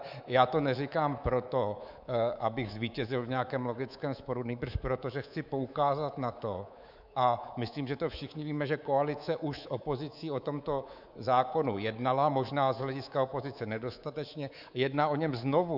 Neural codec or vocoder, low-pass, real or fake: codec, 24 kHz, 3.1 kbps, DualCodec; 5.4 kHz; fake